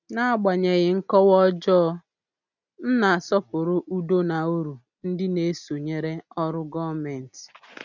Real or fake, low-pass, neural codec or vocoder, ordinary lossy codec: real; 7.2 kHz; none; none